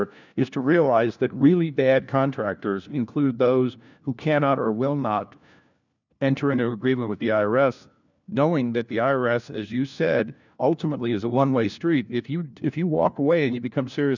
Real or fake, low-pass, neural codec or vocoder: fake; 7.2 kHz; codec, 16 kHz, 1 kbps, FunCodec, trained on LibriTTS, 50 frames a second